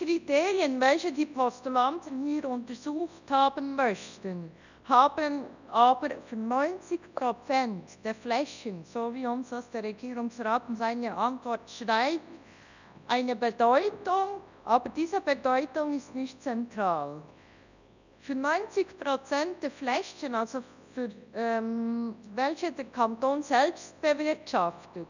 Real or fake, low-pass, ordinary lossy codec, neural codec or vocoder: fake; 7.2 kHz; none; codec, 24 kHz, 0.9 kbps, WavTokenizer, large speech release